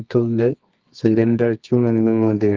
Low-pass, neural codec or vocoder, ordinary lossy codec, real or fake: 7.2 kHz; codec, 24 kHz, 0.9 kbps, WavTokenizer, medium music audio release; Opus, 32 kbps; fake